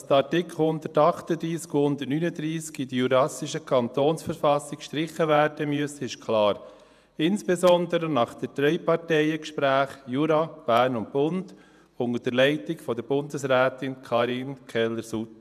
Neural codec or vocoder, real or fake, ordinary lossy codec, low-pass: vocoder, 48 kHz, 128 mel bands, Vocos; fake; none; 14.4 kHz